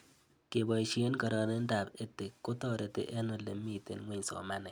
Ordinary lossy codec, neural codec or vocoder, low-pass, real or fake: none; none; none; real